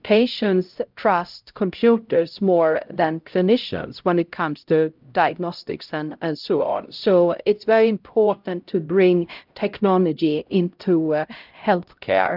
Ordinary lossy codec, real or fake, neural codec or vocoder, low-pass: Opus, 24 kbps; fake; codec, 16 kHz, 0.5 kbps, X-Codec, HuBERT features, trained on LibriSpeech; 5.4 kHz